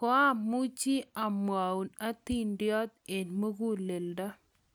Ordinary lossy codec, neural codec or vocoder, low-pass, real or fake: none; none; none; real